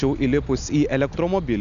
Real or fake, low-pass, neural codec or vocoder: real; 7.2 kHz; none